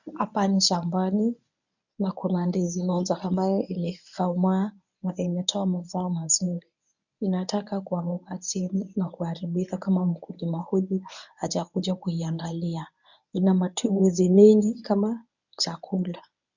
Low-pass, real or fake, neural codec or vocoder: 7.2 kHz; fake; codec, 24 kHz, 0.9 kbps, WavTokenizer, medium speech release version 1